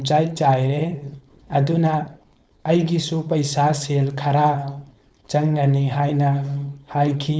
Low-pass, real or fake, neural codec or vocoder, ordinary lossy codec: none; fake; codec, 16 kHz, 4.8 kbps, FACodec; none